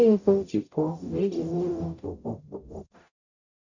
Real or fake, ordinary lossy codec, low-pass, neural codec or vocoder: fake; none; 7.2 kHz; codec, 44.1 kHz, 0.9 kbps, DAC